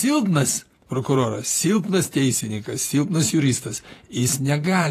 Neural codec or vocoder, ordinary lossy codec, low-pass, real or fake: vocoder, 48 kHz, 128 mel bands, Vocos; AAC, 48 kbps; 14.4 kHz; fake